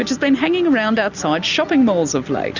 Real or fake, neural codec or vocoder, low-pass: real; none; 7.2 kHz